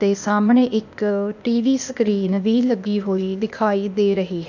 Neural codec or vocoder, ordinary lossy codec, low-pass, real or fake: codec, 16 kHz, 0.8 kbps, ZipCodec; none; 7.2 kHz; fake